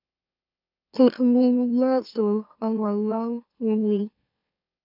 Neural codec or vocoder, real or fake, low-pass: autoencoder, 44.1 kHz, a latent of 192 numbers a frame, MeloTTS; fake; 5.4 kHz